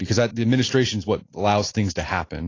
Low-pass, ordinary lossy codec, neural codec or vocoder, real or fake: 7.2 kHz; AAC, 32 kbps; none; real